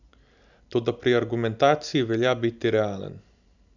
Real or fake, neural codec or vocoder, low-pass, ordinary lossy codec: real; none; 7.2 kHz; none